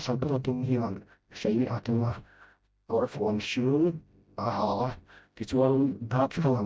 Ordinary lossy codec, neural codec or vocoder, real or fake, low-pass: none; codec, 16 kHz, 0.5 kbps, FreqCodec, smaller model; fake; none